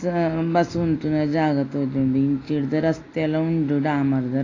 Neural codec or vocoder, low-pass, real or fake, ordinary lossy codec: none; 7.2 kHz; real; AAC, 32 kbps